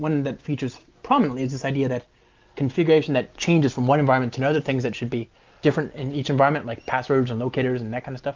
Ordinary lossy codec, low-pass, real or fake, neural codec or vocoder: Opus, 32 kbps; 7.2 kHz; real; none